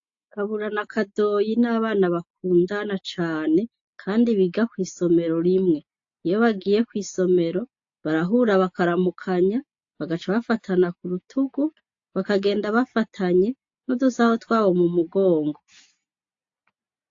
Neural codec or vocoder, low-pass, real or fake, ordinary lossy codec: none; 7.2 kHz; real; AAC, 48 kbps